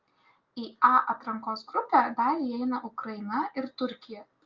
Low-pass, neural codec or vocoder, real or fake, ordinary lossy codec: 7.2 kHz; none; real; Opus, 24 kbps